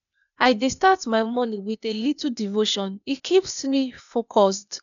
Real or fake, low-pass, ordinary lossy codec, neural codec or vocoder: fake; 7.2 kHz; none; codec, 16 kHz, 0.8 kbps, ZipCodec